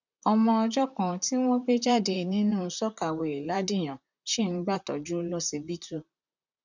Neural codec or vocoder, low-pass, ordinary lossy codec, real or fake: vocoder, 44.1 kHz, 128 mel bands, Pupu-Vocoder; 7.2 kHz; none; fake